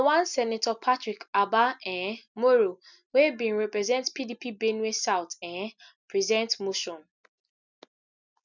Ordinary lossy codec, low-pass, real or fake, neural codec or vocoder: none; 7.2 kHz; real; none